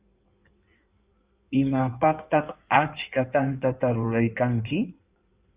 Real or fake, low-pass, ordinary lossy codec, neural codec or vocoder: fake; 3.6 kHz; Opus, 64 kbps; codec, 16 kHz in and 24 kHz out, 1.1 kbps, FireRedTTS-2 codec